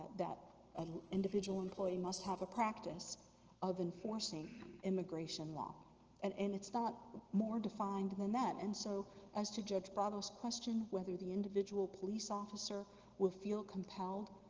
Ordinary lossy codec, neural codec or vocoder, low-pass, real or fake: Opus, 16 kbps; none; 7.2 kHz; real